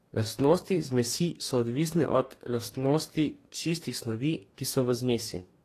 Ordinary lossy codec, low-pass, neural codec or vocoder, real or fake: AAC, 48 kbps; 14.4 kHz; codec, 44.1 kHz, 2.6 kbps, DAC; fake